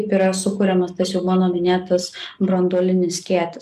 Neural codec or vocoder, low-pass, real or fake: none; 14.4 kHz; real